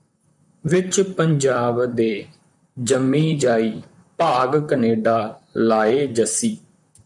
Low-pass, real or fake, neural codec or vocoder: 10.8 kHz; fake; vocoder, 44.1 kHz, 128 mel bands, Pupu-Vocoder